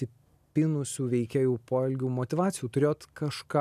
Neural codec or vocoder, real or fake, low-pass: none; real; 14.4 kHz